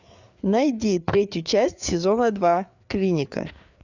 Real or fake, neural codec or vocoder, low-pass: fake; codec, 16 kHz, 4 kbps, FreqCodec, larger model; 7.2 kHz